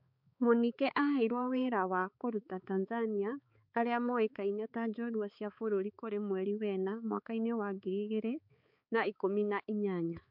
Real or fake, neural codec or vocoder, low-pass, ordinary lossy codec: fake; codec, 16 kHz, 4 kbps, X-Codec, HuBERT features, trained on balanced general audio; 5.4 kHz; MP3, 48 kbps